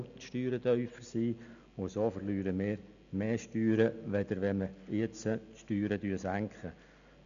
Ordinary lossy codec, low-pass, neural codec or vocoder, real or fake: none; 7.2 kHz; none; real